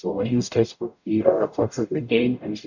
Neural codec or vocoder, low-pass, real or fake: codec, 44.1 kHz, 0.9 kbps, DAC; 7.2 kHz; fake